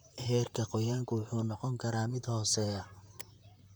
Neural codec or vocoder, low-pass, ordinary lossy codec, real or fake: vocoder, 44.1 kHz, 128 mel bands, Pupu-Vocoder; none; none; fake